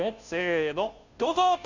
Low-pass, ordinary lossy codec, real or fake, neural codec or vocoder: 7.2 kHz; none; fake; codec, 16 kHz, 0.5 kbps, FunCodec, trained on Chinese and English, 25 frames a second